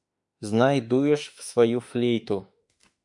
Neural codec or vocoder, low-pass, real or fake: autoencoder, 48 kHz, 32 numbers a frame, DAC-VAE, trained on Japanese speech; 10.8 kHz; fake